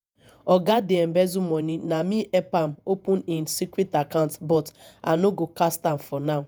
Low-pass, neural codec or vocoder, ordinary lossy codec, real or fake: none; vocoder, 48 kHz, 128 mel bands, Vocos; none; fake